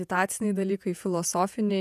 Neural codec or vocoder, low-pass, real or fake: vocoder, 48 kHz, 128 mel bands, Vocos; 14.4 kHz; fake